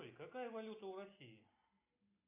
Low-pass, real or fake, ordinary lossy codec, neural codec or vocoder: 3.6 kHz; real; AAC, 32 kbps; none